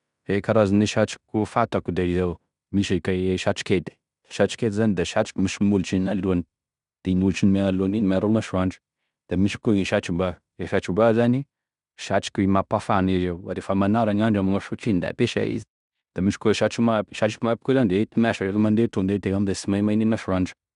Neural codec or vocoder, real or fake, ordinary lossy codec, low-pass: codec, 16 kHz in and 24 kHz out, 0.9 kbps, LongCat-Audio-Codec, fine tuned four codebook decoder; fake; none; 10.8 kHz